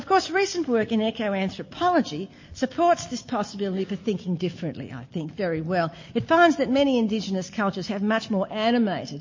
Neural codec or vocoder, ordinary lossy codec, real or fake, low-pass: none; MP3, 32 kbps; real; 7.2 kHz